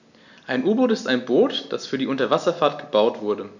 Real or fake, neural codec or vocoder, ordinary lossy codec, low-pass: real; none; none; 7.2 kHz